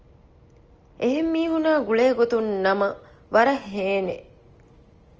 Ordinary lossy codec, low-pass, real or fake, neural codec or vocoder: Opus, 24 kbps; 7.2 kHz; real; none